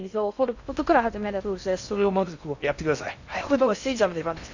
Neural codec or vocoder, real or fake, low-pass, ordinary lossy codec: codec, 16 kHz in and 24 kHz out, 0.6 kbps, FocalCodec, streaming, 2048 codes; fake; 7.2 kHz; none